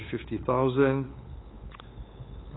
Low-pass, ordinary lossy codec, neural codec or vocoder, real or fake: 7.2 kHz; AAC, 16 kbps; codec, 16 kHz, 8 kbps, FunCodec, trained on LibriTTS, 25 frames a second; fake